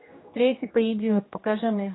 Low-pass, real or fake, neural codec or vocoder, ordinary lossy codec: 7.2 kHz; fake; codec, 16 kHz, 1 kbps, X-Codec, HuBERT features, trained on general audio; AAC, 16 kbps